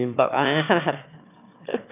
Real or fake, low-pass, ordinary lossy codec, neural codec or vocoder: fake; 3.6 kHz; none; autoencoder, 22.05 kHz, a latent of 192 numbers a frame, VITS, trained on one speaker